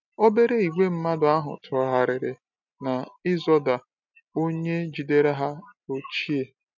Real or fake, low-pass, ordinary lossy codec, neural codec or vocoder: real; 7.2 kHz; none; none